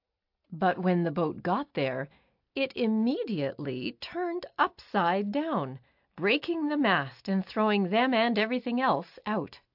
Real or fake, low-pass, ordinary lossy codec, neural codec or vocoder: real; 5.4 kHz; AAC, 48 kbps; none